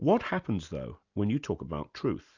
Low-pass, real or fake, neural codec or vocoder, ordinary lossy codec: 7.2 kHz; real; none; Opus, 64 kbps